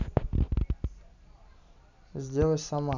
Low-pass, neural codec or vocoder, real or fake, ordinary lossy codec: 7.2 kHz; none; real; none